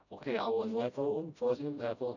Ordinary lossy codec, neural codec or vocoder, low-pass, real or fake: none; codec, 16 kHz, 0.5 kbps, FreqCodec, smaller model; 7.2 kHz; fake